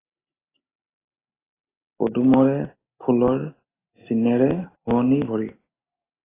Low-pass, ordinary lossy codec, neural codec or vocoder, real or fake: 3.6 kHz; AAC, 16 kbps; none; real